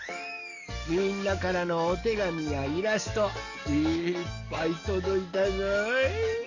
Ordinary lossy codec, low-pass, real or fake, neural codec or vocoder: none; 7.2 kHz; fake; codec, 44.1 kHz, 7.8 kbps, DAC